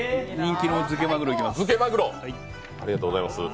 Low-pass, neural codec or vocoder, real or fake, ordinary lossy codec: none; none; real; none